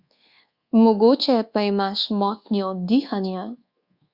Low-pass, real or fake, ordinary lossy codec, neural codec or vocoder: 5.4 kHz; fake; Opus, 64 kbps; codec, 24 kHz, 1.2 kbps, DualCodec